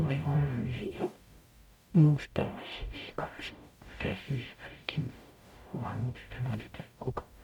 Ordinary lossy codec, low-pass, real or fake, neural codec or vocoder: none; 19.8 kHz; fake; codec, 44.1 kHz, 0.9 kbps, DAC